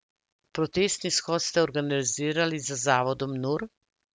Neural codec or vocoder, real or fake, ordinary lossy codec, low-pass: none; real; none; none